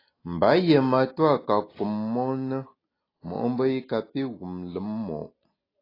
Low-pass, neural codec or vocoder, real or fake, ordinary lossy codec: 5.4 kHz; none; real; AAC, 24 kbps